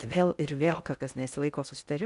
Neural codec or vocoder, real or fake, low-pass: codec, 16 kHz in and 24 kHz out, 0.6 kbps, FocalCodec, streaming, 4096 codes; fake; 10.8 kHz